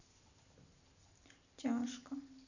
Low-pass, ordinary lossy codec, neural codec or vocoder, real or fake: 7.2 kHz; none; none; real